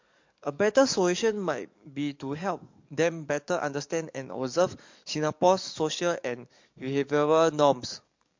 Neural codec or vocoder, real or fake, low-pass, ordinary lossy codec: codec, 44.1 kHz, 7.8 kbps, DAC; fake; 7.2 kHz; MP3, 48 kbps